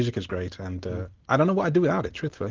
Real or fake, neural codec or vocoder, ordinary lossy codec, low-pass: real; none; Opus, 16 kbps; 7.2 kHz